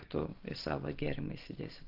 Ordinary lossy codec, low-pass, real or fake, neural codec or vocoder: Opus, 16 kbps; 5.4 kHz; real; none